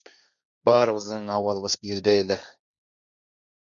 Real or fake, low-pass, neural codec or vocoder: fake; 7.2 kHz; codec, 16 kHz, 1.1 kbps, Voila-Tokenizer